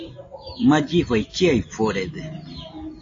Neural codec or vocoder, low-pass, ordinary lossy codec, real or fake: none; 7.2 kHz; AAC, 32 kbps; real